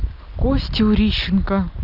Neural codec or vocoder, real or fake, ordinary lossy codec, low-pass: none; real; none; 5.4 kHz